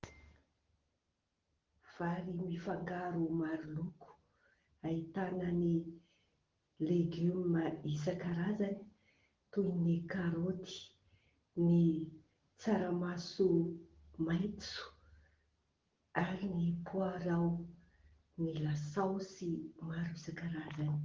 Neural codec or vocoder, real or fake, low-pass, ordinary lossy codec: none; real; 7.2 kHz; Opus, 16 kbps